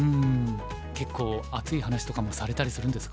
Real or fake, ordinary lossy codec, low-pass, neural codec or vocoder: real; none; none; none